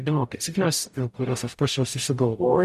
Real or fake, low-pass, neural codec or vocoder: fake; 14.4 kHz; codec, 44.1 kHz, 0.9 kbps, DAC